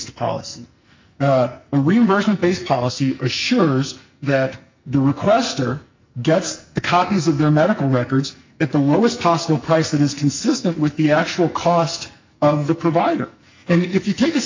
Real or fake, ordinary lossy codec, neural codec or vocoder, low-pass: fake; MP3, 64 kbps; codec, 44.1 kHz, 2.6 kbps, SNAC; 7.2 kHz